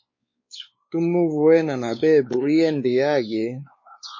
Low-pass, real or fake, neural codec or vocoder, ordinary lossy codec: 7.2 kHz; fake; codec, 16 kHz, 4 kbps, X-Codec, WavLM features, trained on Multilingual LibriSpeech; MP3, 32 kbps